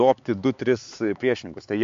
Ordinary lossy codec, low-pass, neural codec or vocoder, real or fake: MP3, 48 kbps; 7.2 kHz; codec, 16 kHz, 4 kbps, X-Codec, HuBERT features, trained on LibriSpeech; fake